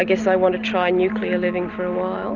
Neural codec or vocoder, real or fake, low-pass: none; real; 7.2 kHz